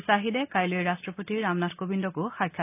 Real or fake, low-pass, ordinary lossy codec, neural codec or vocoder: real; 3.6 kHz; none; none